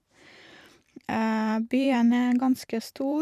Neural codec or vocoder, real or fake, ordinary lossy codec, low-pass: vocoder, 44.1 kHz, 128 mel bands every 512 samples, BigVGAN v2; fake; none; 14.4 kHz